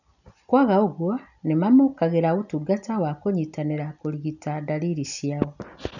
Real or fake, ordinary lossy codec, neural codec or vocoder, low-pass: real; none; none; 7.2 kHz